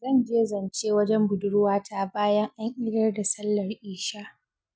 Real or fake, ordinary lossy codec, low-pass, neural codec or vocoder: real; none; none; none